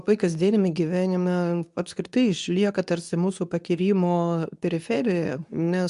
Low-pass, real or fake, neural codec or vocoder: 10.8 kHz; fake; codec, 24 kHz, 0.9 kbps, WavTokenizer, medium speech release version 2